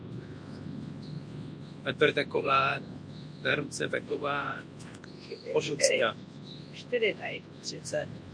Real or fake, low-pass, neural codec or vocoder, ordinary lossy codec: fake; 9.9 kHz; codec, 24 kHz, 0.9 kbps, WavTokenizer, large speech release; MP3, 48 kbps